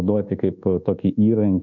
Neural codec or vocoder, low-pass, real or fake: codec, 24 kHz, 1.2 kbps, DualCodec; 7.2 kHz; fake